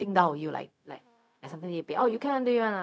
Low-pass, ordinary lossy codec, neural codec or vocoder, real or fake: none; none; codec, 16 kHz, 0.4 kbps, LongCat-Audio-Codec; fake